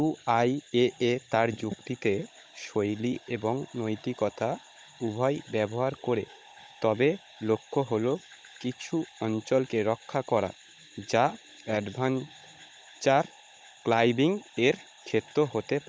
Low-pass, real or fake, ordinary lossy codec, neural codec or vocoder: none; fake; none; codec, 16 kHz, 16 kbps, FunCodec, trained on Chinese and English, 50 frames a second